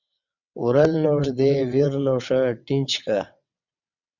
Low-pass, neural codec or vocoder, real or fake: 7.2 kHz; vocoder, 22.05 kHz, 80 mel bands, WaveNeXt; fake